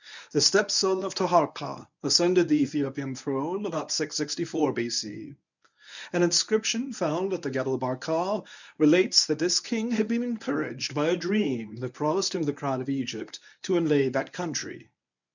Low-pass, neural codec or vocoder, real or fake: 7.2 kHz; codec, 24 kHz, 0.9 kbps, WavTokenizer, medium speech release version 1; fake